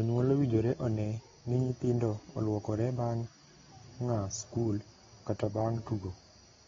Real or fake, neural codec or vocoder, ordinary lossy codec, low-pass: real; none; AAC, 24 kbps; 7.2 kHz